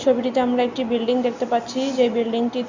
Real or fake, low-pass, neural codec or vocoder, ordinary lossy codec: real; 7.2 kHz; none; Opus, 64 kbps